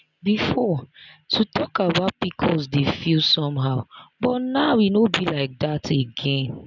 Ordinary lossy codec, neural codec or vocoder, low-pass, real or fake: none; none; 7.2 kHz; real